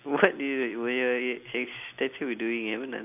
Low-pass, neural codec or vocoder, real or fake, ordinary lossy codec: 3.6 kHz; none; real; AAC, 32 kbps